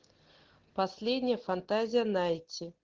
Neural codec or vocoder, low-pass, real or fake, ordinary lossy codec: none; 7.2 kHz; real; Opus, 16 kbps